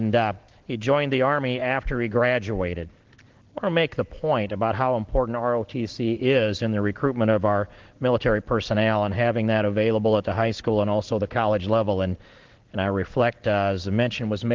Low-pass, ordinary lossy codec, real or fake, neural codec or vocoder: 7.2 kHz; Opus, 16 kbps; real; none